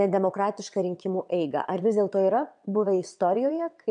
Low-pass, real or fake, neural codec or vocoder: 9.9 kHz; fake; vocoder, 22.05 kHz, 80 mel bands, WaveNeXt